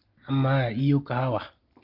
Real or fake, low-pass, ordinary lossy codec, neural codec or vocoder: real; 5.4 kHz; Opus, 24 kbps; none